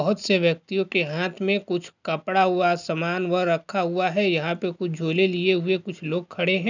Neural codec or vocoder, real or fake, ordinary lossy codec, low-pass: none; real; none; 7.2 kHz